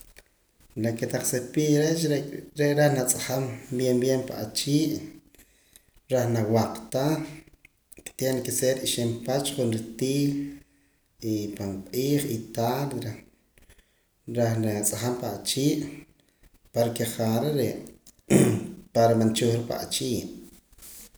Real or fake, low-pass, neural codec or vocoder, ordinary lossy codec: real; none; none; none